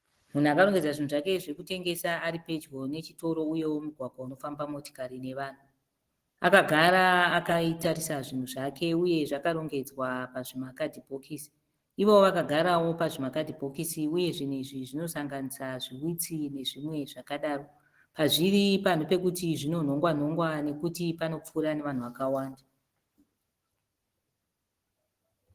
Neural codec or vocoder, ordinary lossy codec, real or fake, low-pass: none; Opus, 16 kbps; real; 14.4 kHz